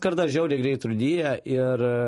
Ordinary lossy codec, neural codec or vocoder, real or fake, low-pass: MP3, 48 kbps; vocoder, 44.1 kHz, 128 mel bands every 512 samples, BigVGAN v2; fake; 14.4 kHz